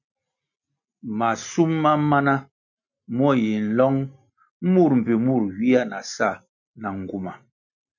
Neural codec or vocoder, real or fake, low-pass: none; real; 7.2 kHz